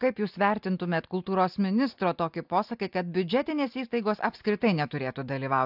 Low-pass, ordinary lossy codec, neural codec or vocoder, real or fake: 5.4 kHz; AAC, 48 kbps; none; real